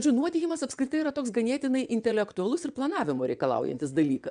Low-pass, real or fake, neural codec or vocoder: 9.9 kHz; fake; vocoder, 22.05 kHz, 80 mel bands, WaveNeXt